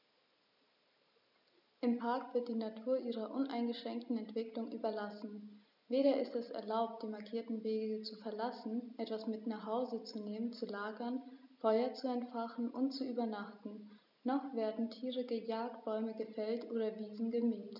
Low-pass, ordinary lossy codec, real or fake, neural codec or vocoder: 5.4 kHz; none; real; none